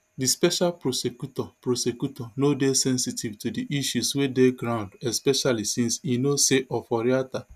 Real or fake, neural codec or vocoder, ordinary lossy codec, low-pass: real; none; none; 14.4 kHz